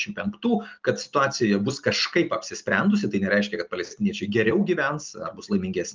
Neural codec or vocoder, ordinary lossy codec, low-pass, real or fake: none; Opus, 32 kbps; 7.2 kHz; real